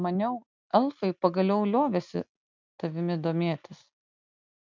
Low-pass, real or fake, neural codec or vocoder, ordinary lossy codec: 7.2 kHz; real; none; MP3, 64 kbps